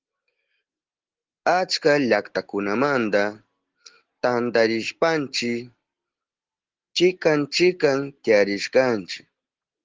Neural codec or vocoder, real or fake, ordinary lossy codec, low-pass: none; real; Opus, 16 kbps; 7.2 kHz